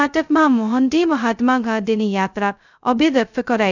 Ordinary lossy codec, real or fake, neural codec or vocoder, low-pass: none; fake; codec, 16 kHz, 0.2 kbps, FocalCodec; 7.2 kHz